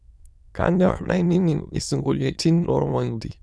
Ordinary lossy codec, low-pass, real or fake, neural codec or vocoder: none; none; fake; autoencoder, 22.05 kHz, a latent of 192 numbers a frame, VITS, trained on many speakers